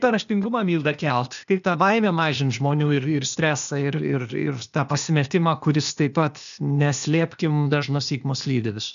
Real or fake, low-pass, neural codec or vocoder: fake; 7.2 kHz; codec, 16 kHz, 0.8 kbps, ZipCodec